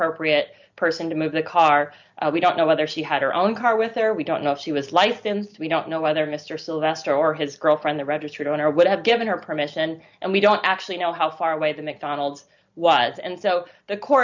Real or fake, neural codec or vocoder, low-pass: real; none; 7.2 kHz